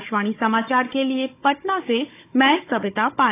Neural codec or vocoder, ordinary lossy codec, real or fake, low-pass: codec, 16 kHz, 16 kbps, FunCodec, trained on Chinese and English, 50 frames a second; AAC, 24 kbps; fake; 3.6 kHz